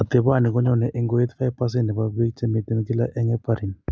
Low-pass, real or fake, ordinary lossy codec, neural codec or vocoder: none; real; none; none